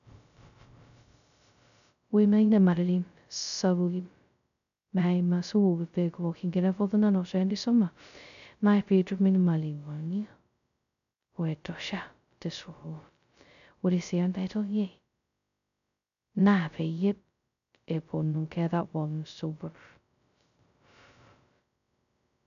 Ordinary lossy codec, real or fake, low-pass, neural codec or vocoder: none; fake; 7.2 kHz; codec, 16 kHz, 0.2 kbps, FocalCodec